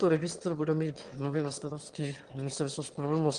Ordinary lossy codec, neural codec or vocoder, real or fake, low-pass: Opus, 24 kbps; autoencoder, 22.05 kHz, a latent of 192 numbers a frame, VITS, trained on one speaker; fake; 9.9 kHz